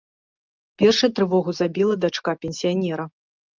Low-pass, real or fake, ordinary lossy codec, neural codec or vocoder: 7.2 kHz; real; Opus, 24 kbps; none